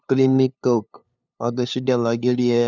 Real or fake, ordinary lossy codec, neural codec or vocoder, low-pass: fake; none; codec, 16 kHz, 2 kbps, FunCodec, trained on LibriTTS, 25 frames a second; 7.2 kHz